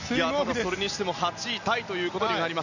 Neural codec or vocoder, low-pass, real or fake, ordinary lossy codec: none; 7.2 kHz; real; none